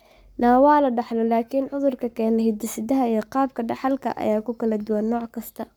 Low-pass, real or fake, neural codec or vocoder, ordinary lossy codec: none; fake; codec, 44.1 kHz, 7.8 kbps, Pupu-Codec; none